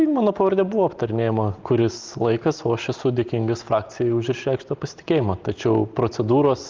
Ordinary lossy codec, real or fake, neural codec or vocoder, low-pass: Opus, 32 kbps; real; none; 7.2 kHz